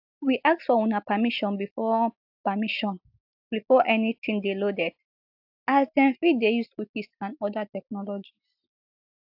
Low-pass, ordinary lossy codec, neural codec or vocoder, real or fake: 5.4 kHz; AAC, 48 kbps; none; real